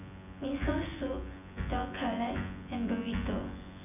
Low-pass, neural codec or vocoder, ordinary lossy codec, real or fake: 3.6 kHz; vocoder, 24 kHz, 100 mel bands, Vocos; none; fake